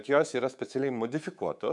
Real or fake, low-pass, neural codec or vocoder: fake; 10.8 kHz; codec, 24 kHz, 3.1 kbps, DualCodec